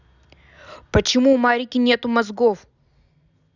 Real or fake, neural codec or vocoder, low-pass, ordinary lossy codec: real; none; 7.2 kHz; none